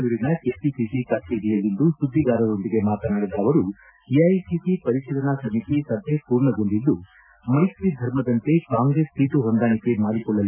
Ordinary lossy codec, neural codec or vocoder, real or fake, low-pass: none; none; real; 3.6 kHz